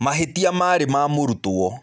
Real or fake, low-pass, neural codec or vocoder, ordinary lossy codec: real; none; none; none